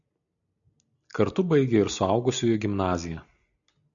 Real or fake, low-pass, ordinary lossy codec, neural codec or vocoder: real; 7.2 kHz; MP3, 96 kbps; none